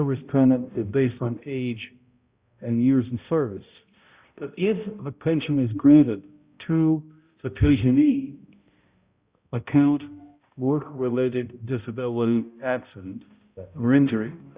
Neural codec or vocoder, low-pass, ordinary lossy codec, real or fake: codec, 16 kHz, 0.5 kbps, X-Codec, HuBERT features, trained on balanced general audio; 3.6 kHz; Opus, 64 kbps; fake